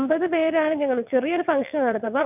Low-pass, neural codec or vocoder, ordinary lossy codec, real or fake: 3.6 kHz; vocoder, 22.05 kHz, 80 mel bands, WaveNeXt; none; fake